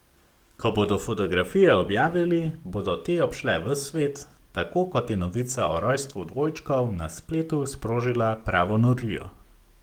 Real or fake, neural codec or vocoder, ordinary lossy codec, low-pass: fake; codec, 44.1 kHz, 7.8 kbps, DAC; Opus, 24 kbps; 19.8 kHz